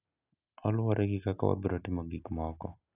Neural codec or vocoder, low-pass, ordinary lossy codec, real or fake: none; 3.6 kHz; none; real